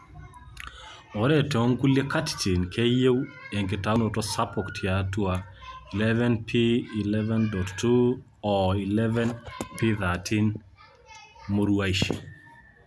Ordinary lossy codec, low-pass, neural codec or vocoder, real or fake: none; none; none; real